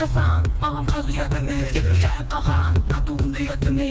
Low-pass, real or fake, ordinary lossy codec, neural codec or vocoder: none; fake; none; codec, 16 kHz, 2 kbps, FreqCodec, smaller model